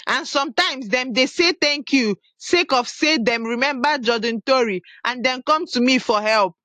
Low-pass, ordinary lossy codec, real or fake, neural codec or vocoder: 14.4 kHz; AAC, 64 kbps; real; none